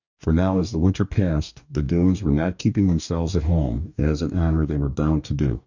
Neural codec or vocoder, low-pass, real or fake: codec, 44.1 kHz, 2.6 kbps, DAC; 7.2 kHz; fake